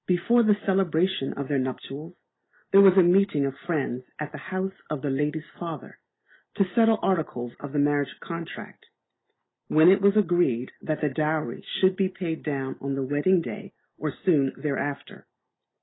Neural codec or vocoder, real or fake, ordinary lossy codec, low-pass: none; real; AAC, 16 kbps; 7.2 kHz